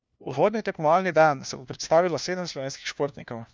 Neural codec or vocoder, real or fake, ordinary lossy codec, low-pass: codec, 16 kHz, 1 kbps, FunCodec, trained on LibriTTS, 50 frames a second; fake; none; none